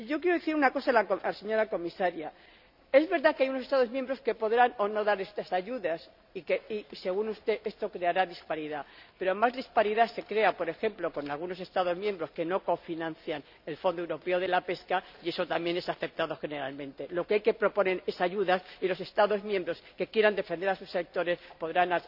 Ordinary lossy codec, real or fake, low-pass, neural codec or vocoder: none; real; 5.4 kHz; none